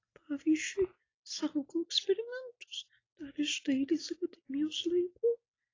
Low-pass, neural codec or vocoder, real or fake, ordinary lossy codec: 7.2 kHz; none; real; AAC, 32 kbps